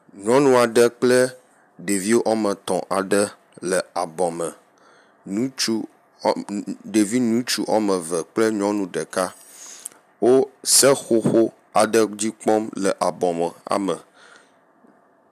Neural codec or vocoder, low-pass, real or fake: none; 14.4 kHz; real